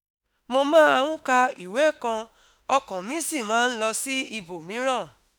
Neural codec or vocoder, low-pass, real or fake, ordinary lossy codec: autoencoder, 48 kHz, 32 numbers a frame, DAC-VAE, trained on Japanese speech; none; fake; none